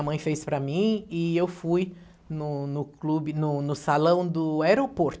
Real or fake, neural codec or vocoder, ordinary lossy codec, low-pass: real; none; none; none